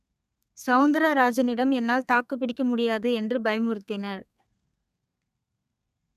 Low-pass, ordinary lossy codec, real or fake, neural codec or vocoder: 14.4 kHz; none; fake; codec, 32 kHz, 1.9 kbps, SNAC